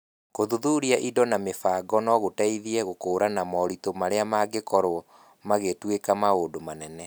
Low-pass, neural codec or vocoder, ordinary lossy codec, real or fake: none; none; none; real